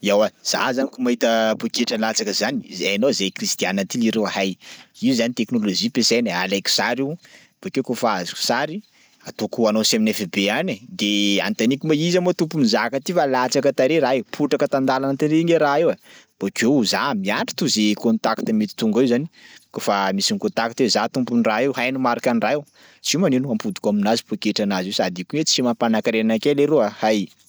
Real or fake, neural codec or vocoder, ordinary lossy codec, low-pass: real; none; none; none